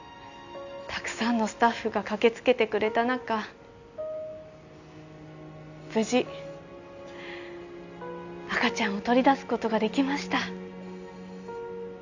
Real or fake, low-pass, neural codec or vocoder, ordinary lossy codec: real; 7.2 kHz; none; none